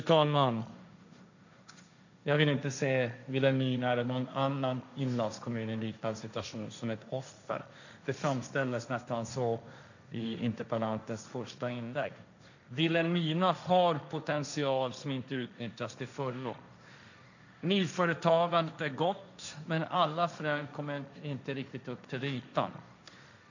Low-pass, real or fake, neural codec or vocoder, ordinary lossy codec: 7.2 kHz; fake; codec, 16 kHz, 1.1 kbps, Voila-Tokenizer; none